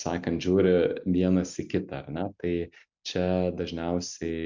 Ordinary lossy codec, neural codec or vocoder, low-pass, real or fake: MP3, 64 kbps; none; 7.2 kHz; real